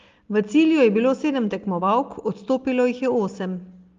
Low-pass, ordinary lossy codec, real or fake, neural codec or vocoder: 7.2 kHz; Opus, 32 kbps; real; none